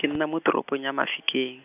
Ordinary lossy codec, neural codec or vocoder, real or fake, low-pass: none; none; real; 3.6 kHz